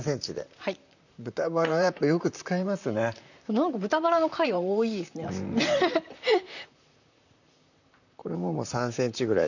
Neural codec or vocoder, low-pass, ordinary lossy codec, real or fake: vocoder, 44.1 kHz, 128 mel bands, Pupu-Vocoder; 7.2 kHz; none; fake